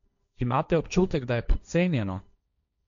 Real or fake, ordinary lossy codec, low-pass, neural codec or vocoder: fake; none; 7.2 kHz; codec, 16 kHz, 1.1 kbps, Voila-Tokenizer